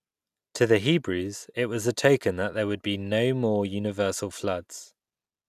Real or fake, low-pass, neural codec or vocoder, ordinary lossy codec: real; 14.4 kHz; none; none